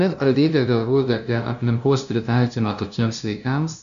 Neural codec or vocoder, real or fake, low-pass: codec, 16 kHz, 0.5 kbps, FunCodec, trained on LibriTTS, 25 frames a second; fake; 7.2 kHz